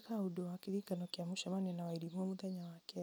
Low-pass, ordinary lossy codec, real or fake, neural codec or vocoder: none; none; real; none